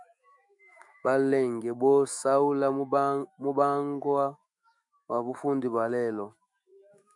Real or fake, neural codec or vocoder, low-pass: fake; autoencoder, 48 kHz, 128 numbers a frame, DAC-VAE, trained on Japanese speech; 10.8 kHz